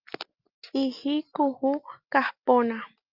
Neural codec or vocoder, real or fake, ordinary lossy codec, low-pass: none; real; Opus, 24 kbps; 5.4 kHz